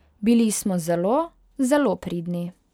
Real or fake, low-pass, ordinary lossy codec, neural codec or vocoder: real; 19.8 kHz; none; none